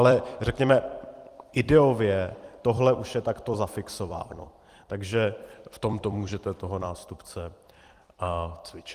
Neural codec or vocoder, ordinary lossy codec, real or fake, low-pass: vocoder, 44.1 kHz, 128 mel bands every 256 samples, BigVGAN v2; Opus, 24 kbps; fake; 14.4 kHz